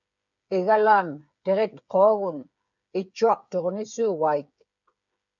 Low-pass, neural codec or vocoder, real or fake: 7.2 kHz; codec, 16 kHz, 8 kbps, FreqCodec, smaller model; fake